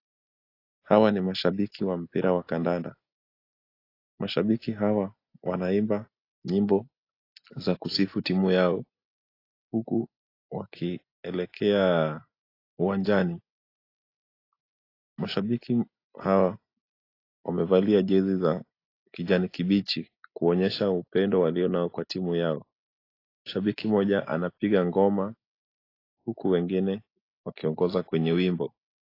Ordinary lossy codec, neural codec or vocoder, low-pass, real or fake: AAC, 32 kbps; none; 5.4 kHz; real